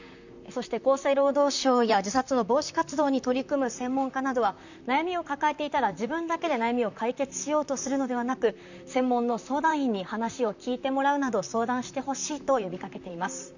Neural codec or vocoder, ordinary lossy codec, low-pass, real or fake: vocoder, 44.1 kHz, 128 mel bands, Pupu-Vocoder; none; 7.2 kHz; fake